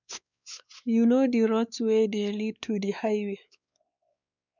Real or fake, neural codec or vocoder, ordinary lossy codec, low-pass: fake; codec, 16 kHz, 4 kbps, X-Codec, WavLM features, trained on Multilingual LibriSpeech; none; 7.2 kHz